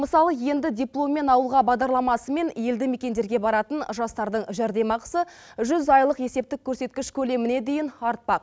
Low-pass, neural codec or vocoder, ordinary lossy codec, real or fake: none; none; none; real